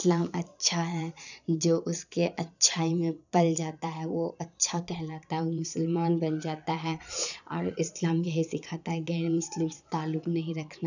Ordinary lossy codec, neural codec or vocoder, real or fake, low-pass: none; vocoder, 22.05 kHz, 80 mel bands, Vocos; fake; 7.2 kHz